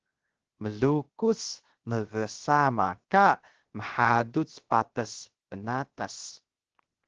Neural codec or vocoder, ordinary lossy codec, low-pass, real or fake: codec, 16 kHz, 0.7 kbps, FocalCodec; Opus, 16 kbps; 7.2 kHz; fake